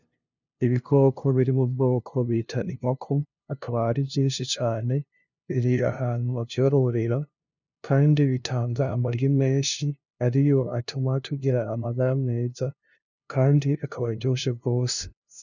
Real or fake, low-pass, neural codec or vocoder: fake; 7.2 kHz; codec, 16 kHz, 0.5 kbps, FunCodec, trained on LibriTTS, 25 frames a second